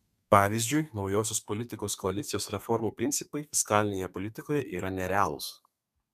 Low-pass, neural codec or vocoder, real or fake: 14.4 kHz; codec, 32 kHz, 1.9 kbps, SNAC; fake